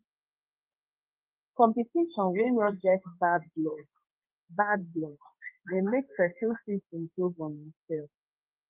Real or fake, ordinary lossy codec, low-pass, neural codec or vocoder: fake; Opus, 32 kbps; 3.6 kHz; codec, 16 kHz in and 24 kHz out, 1 kbps, XY-Tokenizer